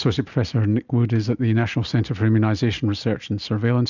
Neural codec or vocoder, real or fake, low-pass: none; real; 7.2 kHz